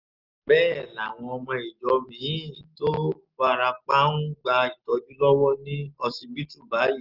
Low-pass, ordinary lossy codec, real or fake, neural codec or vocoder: 5.4 kHz; Opus, 32 kbps; real; none